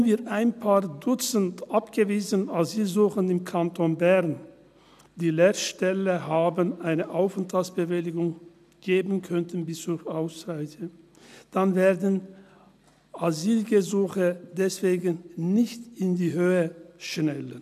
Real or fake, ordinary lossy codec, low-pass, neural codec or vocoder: real; none; 14.4 kHz; none